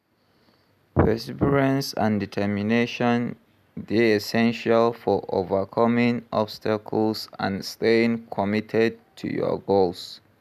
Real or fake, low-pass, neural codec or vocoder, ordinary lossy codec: real; 14.4 kHz; none; none